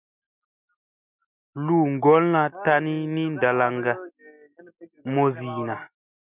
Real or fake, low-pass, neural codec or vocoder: real; 3.6 kHz; none